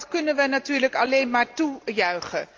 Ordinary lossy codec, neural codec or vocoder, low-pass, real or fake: Opus, 32 kbps; none; 7.2 kHz; real